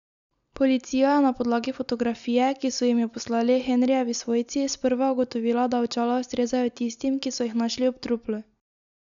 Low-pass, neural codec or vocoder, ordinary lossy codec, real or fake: 7.2 kHz; none; none; real